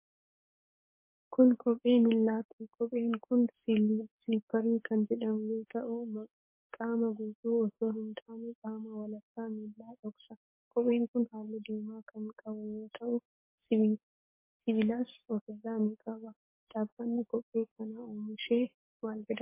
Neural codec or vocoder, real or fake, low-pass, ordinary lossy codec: codec, 44.1 kHz, 7.8 kbps, DAC; fake; 3.6 kHz; MP3, 24 kbps